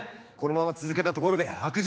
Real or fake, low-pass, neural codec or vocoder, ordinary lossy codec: fake; none; codec, 16 kHz, 2 kbps, X-Codec, HuBERT features, trained on general audio; none